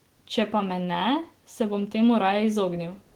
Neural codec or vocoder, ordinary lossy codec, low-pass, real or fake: autoencoder, 48 kHz, 128 numbers a frame, DAC-VAE, trained on Japanese speech; Opus, 16 kbps; 19.8 kHz; fake